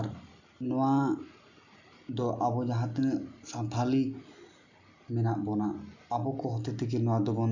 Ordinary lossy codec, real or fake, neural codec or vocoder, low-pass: none; real; none; 7.2 kHz